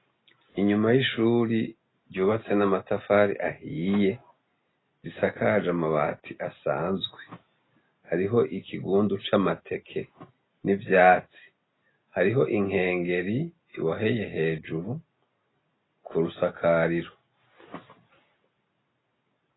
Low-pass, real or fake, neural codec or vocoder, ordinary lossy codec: 7.2 kHz; real; none; AAC, 16 kbps